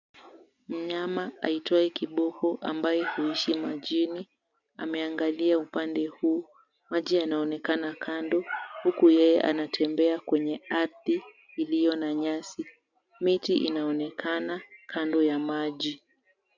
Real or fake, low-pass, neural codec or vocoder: real; 7.2 kHz; none